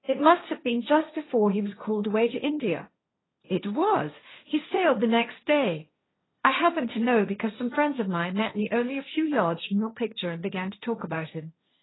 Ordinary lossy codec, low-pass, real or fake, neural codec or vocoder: AAC, 16 kbps; 7.2 kHz; fake; codec, 16 kHz, 1.1 kbps, Voila-Tokenizer